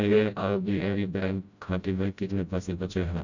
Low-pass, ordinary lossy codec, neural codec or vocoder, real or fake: 7.2 kHz; none; codec, 16 kHz, 0.5 kbps, FreqCodec, smaller model; fake